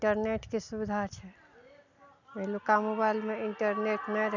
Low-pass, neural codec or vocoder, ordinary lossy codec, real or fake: 7.2 kHz; none; none; real